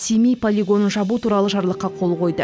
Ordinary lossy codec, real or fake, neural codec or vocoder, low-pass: none; real; none; none